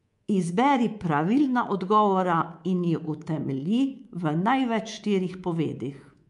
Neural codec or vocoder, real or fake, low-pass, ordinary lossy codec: codec, 24 kHz, 3.1 kbps, DualCodec; fake; 10.8 kHz; MP3, 64 kbps